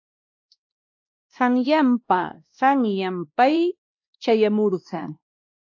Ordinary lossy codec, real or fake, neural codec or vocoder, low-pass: AAC, 48 kbps; fake; codec, 16 kHz, 1 kbps, X-Codec, WavLM features, trained on Multilingual LibriSpeech; 7.2 kHz